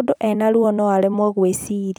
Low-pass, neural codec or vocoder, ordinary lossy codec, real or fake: none; vocoder, 44.1 kHz, 128 mel bands every 256 samples, BigVGAN v2; none; fake